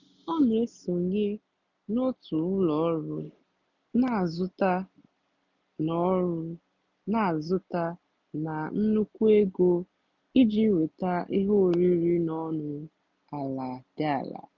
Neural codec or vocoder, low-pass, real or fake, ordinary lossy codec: none; 7.2 kHz; real; none